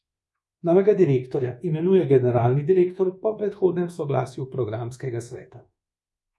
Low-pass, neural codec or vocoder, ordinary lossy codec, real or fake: none; codec, 24 kHz, 1.2 kbps, DualCodec; none; fake